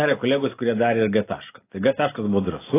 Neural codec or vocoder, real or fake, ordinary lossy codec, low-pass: none; real; AAC, 16 kbps; 3.6 kHz